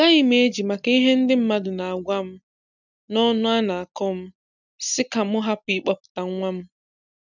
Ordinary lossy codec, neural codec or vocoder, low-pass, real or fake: none; none; 7.2 kHz; real